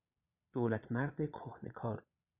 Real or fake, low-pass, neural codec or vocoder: fake; 3.6 kHz; vocoder, 22.05 kHz, 80 mel bands, Vocos